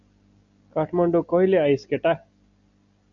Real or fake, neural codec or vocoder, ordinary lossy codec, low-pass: real; none; MP3, 96 kbps; 7.2 kHz